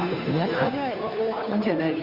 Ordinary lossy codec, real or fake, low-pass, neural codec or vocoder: none; fake; 5.4 kHz; codec, 16 kHz, 2 kbps, FunCodec, trained on Chinese and English, 25 frames a second